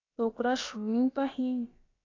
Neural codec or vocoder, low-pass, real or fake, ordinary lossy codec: codec, 16 kHz, about 1 kbps, DyCAST, with the encoder's durations; 7.2 kHz; fake; AAC, 32 kbps